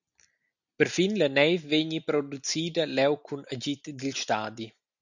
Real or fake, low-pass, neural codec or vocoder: real; 7.2 kHz; none